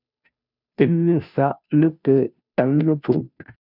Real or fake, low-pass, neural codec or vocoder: fake; 5.4 kHz; codec, 16 kHz, 0.5 kbps, FunCodec, trained on Chinese and English, 25 frames a second